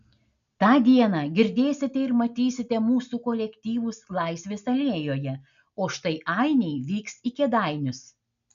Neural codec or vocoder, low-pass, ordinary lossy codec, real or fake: none; 7.2 kHz; MP3, 96 kbps; real